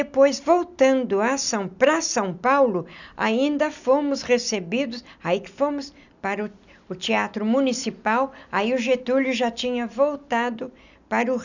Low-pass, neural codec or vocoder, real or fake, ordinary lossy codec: 7.2 kHz; none; real; none